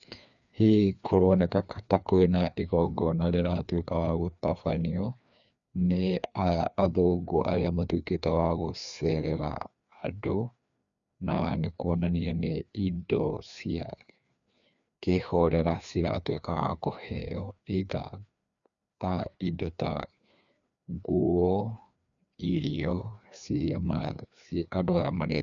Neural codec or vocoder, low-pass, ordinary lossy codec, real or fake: codec, 16 kHz, 2 kbps, FreqCodec, larger model; 7.2 kHz; none; fake